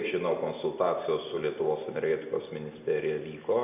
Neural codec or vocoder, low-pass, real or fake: none; 3.6 kHz; real